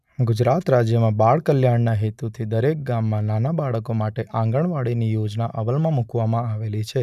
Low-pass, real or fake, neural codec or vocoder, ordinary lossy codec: 14.4 kHz; real; none; none